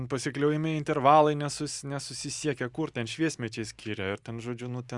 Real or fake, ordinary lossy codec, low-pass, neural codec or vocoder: real; Opus, 64 kbps; 10.8 kHz; none